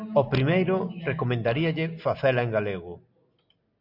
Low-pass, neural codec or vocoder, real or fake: 5.4 kHz; none; real